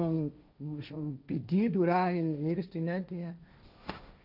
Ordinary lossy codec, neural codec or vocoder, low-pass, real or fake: none; codec, 16 kHz, 1.1 kbps, Voila-Tokenizer; 5.4 kHz; fake